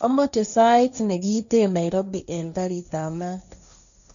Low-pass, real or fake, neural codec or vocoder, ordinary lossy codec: 7.2 kHz; fake; codec, 16 kHz, 1.1 kbps, Voila-Tokenizer; none